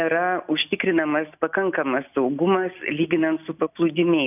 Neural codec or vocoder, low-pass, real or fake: none; 3.6 kHz; real